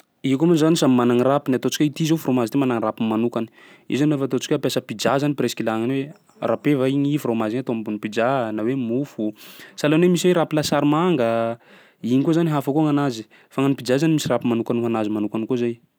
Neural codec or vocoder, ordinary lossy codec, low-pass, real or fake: none; none; none; real